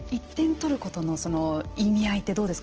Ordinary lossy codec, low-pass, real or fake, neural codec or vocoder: Opus, 16 kbps; 7.2 kHz; real; none